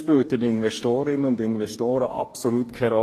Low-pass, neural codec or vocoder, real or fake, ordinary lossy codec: 14.4 kHz; codec, 44.1 kHz, 2.6 kbps, DAC; fake; AAC, 48 kbps